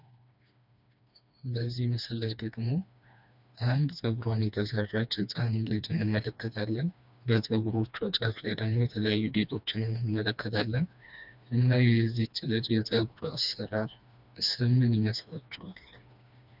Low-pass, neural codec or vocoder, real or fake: 5.4 kHz; codec, 16 kHz, 2 kbps, FreqCodec, smaller model; fake